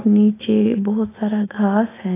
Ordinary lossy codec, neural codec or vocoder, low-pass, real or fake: AAC, 16 kbps; none; 3.6 kHz; real